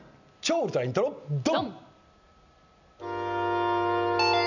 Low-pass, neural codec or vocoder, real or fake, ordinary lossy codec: 7.2 kHz; none; real; none